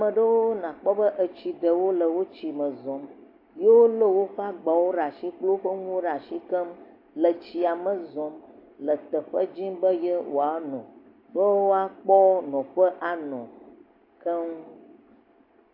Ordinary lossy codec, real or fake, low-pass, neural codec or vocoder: AAC, 32 kbps; real; 5.4 kHz; none